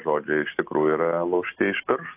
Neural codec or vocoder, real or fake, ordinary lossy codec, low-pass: none; real; AAC, 24 kbps; 3.6 kHz